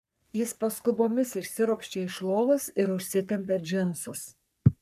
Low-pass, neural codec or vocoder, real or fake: 14.4 kHz; codec, 44.1 kHz, 3.4 kbps, Pupu-Codec; fake